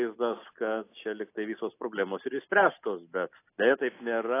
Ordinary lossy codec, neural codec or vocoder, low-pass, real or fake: AAC, 24 kbps; none; 3.6 kHz; real